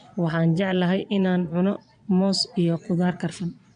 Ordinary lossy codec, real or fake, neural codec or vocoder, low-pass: AAC, 96 kbps; fake; vocoder, 22.05 kHz, 80 mel bands, Vocos; 9.9 kHz